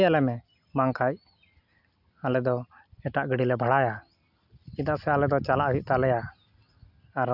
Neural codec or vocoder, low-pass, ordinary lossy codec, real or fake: none; 5.4 kHz; none; real